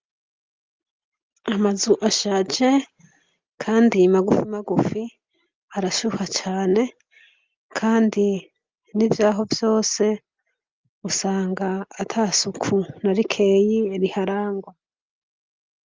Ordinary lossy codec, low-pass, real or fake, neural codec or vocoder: Opus, 32 kbps; 7.2 kHz; real; none